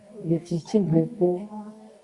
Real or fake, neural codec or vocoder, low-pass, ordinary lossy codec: fake; codec, 24 kHz, 0.9 kbps, WavTokenizer, medium music audio release; 10.8 kHz; Opus, 64 kbps